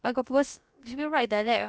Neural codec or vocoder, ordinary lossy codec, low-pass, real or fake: codec, 16 kHz, about 1 kbps, DyCAST, with the encoder's durations; none; none; fake